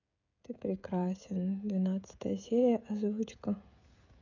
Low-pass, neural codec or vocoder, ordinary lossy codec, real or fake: 7.2 kHz; codec, 16 kHz, 16 kbps, FreqCodec, smaller model; none; fake